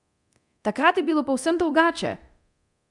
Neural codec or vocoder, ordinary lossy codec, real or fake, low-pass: codec, 24 kHz, 0.9 kbps, DualCodec; none; fake; 10.8 kHz